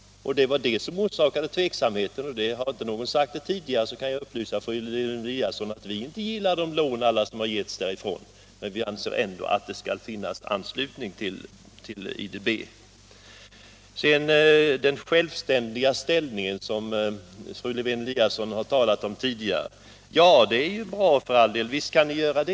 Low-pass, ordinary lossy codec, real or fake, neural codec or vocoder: none; none; real; none